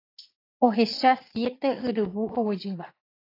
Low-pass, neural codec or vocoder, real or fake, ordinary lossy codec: 5.4 kHz; vocoder, 44.1 kHz, 80 mel bands, Vocos; fake; AAC, 24 kbps